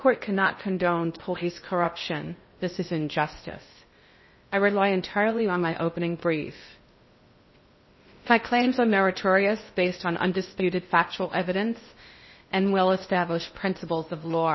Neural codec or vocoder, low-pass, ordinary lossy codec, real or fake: codec, 16 kHz in and 24 kHz out, 0.6 kbps, FocalCodec, streaming, 2048 codes; 7.2 kHz; MP3, 24 kbps; fake